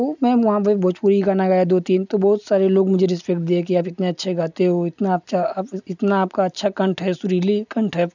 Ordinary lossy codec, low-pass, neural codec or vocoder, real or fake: none; 7.2 kHz; none; real